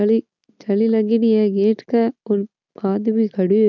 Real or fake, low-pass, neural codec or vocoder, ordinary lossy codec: real; 7.2 kHz; none; none